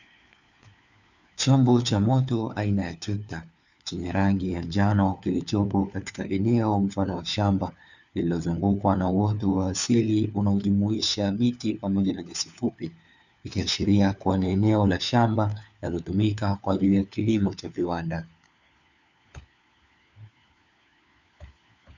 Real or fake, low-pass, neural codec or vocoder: fake; 7.2 kHz; codec, 16 kHz, 4 kbps, FunCodec, trained on LibriTTS, 50 frames a second